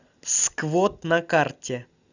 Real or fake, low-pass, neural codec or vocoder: real; 7.2 kHz; none